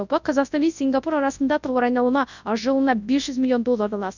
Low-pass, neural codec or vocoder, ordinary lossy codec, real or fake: 7.2 kHz; codec, 24 kHz, 0.9 kbps, WavTokenizer, large speech release; none; fake